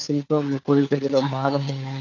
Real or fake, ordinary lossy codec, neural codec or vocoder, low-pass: fake; none; codec, 16 kHz, 4 kbps, FunCodec, trained on LibriTTS, 50 frames a second; 7.2 kHz